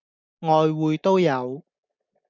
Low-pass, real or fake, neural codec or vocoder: 7.2 kHz; real; none